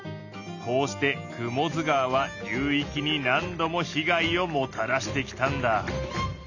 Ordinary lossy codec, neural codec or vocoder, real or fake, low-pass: none; none; real; 7.2 kHz